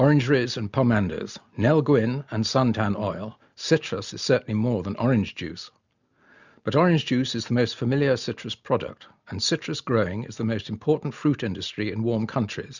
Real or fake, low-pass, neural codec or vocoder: real; 7.2 kHz; none